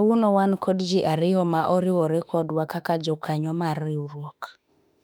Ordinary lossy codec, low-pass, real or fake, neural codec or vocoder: none; 19.8 kHz; fake; autoencoder, 48 kHz, 32 numbers a frame, DAC-VAE, trained on Japanese speech